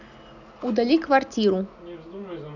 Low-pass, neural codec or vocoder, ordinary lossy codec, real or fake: 7.2 kHz; none; none; real